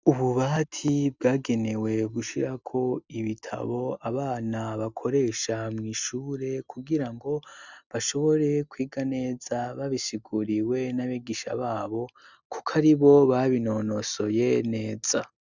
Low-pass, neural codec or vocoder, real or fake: 7.2 kHz; none; real